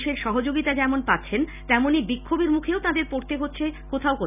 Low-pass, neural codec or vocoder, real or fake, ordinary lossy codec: 3.6 kHz; none; real; none